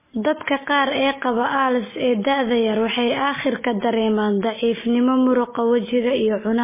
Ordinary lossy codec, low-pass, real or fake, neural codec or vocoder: MP3, 16 kbps; 3.6 kHz; real; none